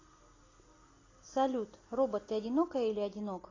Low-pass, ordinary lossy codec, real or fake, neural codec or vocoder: 7.2 kHz; AAC, 32 kbps; real; none